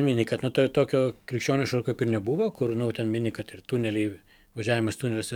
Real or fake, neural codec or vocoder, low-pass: fake; codec, 44.1 kHz, 7.8 kbps, DAC; 19.8 kHz